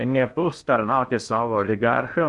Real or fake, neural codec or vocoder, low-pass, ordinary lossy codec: fake; codec, 16 kHz in and 24 kHz out, 0.6 kbps, FocalCodec, streaming, 4096 codes; 10.8 kHz; Opus, 64 kbps